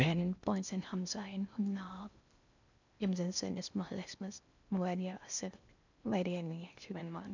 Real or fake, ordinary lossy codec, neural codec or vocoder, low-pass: fake; none; codec, 16 kHz in and 24 kHz out, 0.8 kbps, FocalCodec, streaming, 65536 codes; 7.2 kHz